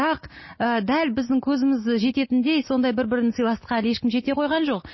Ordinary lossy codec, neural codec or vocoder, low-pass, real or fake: MP3, 24 kbps; none; 7.2 kHz; real